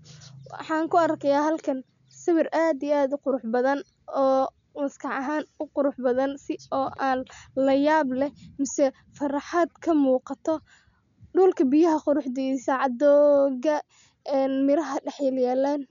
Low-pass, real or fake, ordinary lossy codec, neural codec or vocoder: 7.2 kHz; real; none; none